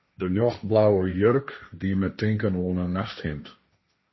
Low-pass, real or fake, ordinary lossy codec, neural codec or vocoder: 7.2 kHz; fake; MP3, 24 kbps; codec, 16 kHz, 1.1 kbps, Voila-Tokenizer